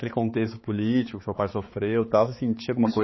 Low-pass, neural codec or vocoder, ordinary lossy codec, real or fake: 7.2 kHz; codec, 16 kHz, 4 kbps, X-Codec, HuBERT features, trained on balanced general audio; MP3, 24 kbps; fake